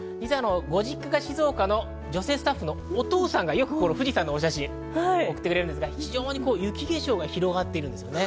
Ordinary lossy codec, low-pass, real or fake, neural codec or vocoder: none; none; real; none